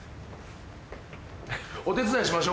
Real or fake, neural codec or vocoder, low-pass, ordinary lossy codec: real; none; none; none